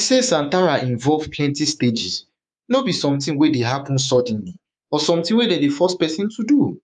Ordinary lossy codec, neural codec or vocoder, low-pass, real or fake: none; codec, 24 kHz, 3.1 kbps, DualCodec; 10.8 kHz; fake